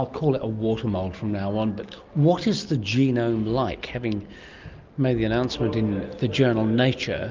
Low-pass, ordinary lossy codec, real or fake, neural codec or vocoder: 7.2 kHz; Opus, 24 kbps; real; none